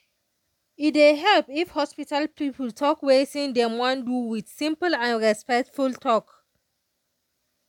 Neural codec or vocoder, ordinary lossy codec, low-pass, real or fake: none; none; 19.8 kHz; real